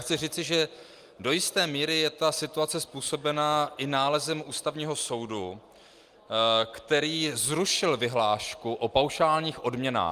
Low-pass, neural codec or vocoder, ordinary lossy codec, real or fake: 14.4 kHz; none; Opus, 32 kbps; real